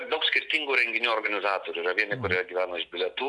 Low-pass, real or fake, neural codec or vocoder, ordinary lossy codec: 10.8 kHz; real; none; AAC, 64 kbps